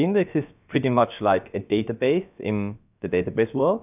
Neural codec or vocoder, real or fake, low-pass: codec, 16 kHz, about 1 kbps, DyCAST, with the encoder's durations; fake; 3.6 kHz